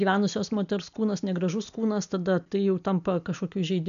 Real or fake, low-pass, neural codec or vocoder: real; 7.2 kHz; none